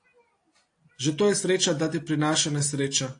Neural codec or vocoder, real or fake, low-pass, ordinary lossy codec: none; real; 9.9 kHz; AAC, 48 kbps